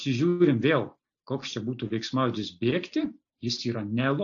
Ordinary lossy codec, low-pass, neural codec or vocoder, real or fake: AAC, 48 kbps; 7.2 kHz; none; real